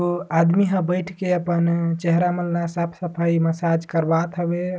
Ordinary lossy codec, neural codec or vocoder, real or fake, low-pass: none; none; real; none